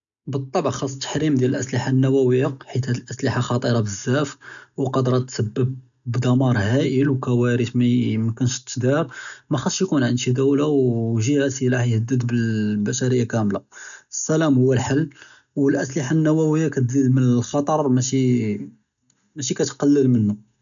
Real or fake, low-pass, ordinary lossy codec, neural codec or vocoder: real; 7.2 kHz; none; none